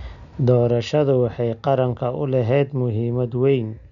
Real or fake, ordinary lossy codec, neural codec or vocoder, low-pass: real; none; none; 7.2 kHz